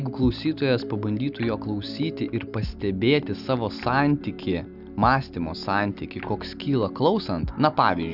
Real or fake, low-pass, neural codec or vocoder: real; 5.4 kHz; none